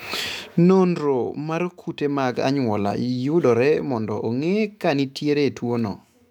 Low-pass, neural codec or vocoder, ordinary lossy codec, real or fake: 19.8 kHz; autoencoder, 48 kHz, 128 numbers a frame, DAC-VAE, trained on Japanese speech; none; fake